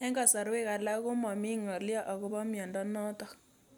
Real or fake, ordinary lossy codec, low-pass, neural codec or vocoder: real; none; none; none